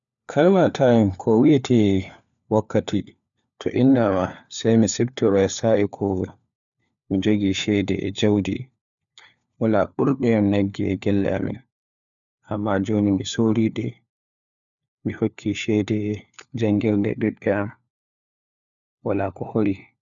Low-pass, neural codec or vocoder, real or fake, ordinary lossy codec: 7.2 kHz; codec, 16 kHz, 4 kbps, FunCodec, trained on LibriTTS, 50 frames a second; fake; none